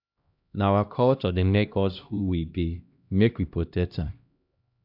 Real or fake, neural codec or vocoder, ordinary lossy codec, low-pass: fake; codec, 16 kHz, 1 kbps, X-Codec, HuBERT features, trained on LibriSpeech; none; 5.4 kHz